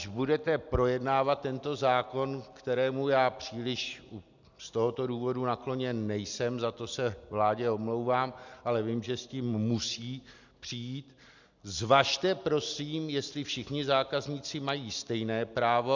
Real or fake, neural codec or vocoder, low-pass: real; none; 7.2 kHz